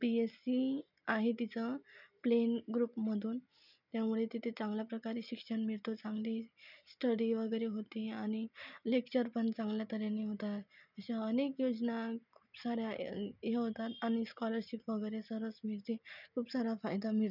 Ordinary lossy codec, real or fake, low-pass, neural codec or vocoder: none; real; 5.4 kHz; none